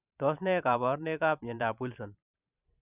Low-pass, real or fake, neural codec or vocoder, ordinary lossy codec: 3.6 kHz; fake; vocoder, 44.1 kHz, 128 mel bands every 512 samples, BigVGAN v2; none